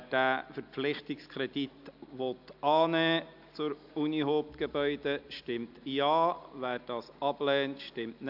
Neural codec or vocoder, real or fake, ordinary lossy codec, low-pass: none; real; AAC, 48 kbps; 5.4 kHz